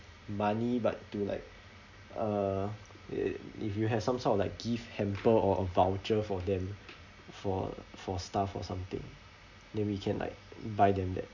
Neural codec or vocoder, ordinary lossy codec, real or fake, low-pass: none; none; real; 7.2 kHz